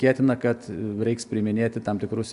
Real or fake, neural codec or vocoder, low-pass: real; none; 10.8 kHz